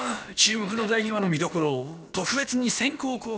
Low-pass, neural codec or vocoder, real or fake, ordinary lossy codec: none; codec, 16 kHz, about 1 kbps, DyCAST, with the encoder's durations; fake; none